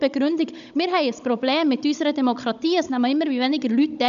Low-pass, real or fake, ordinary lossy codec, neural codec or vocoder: 7.2 kHz; fake; none; codec, 16 kHz, 16 kbps, FunCodec, trained on Chinese and English, 50 frames a second